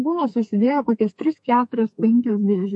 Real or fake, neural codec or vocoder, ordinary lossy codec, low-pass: fake; codec, 32 kHz, 1.9 kbps, SNAC; MP3, 48 kbps; 10.8 kHz